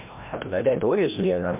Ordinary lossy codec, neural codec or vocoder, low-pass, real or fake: MP3, 32 kbps; codec, 16 kHz, 1 kbps, FreqCodec, larger model; 3.6 kHz; fake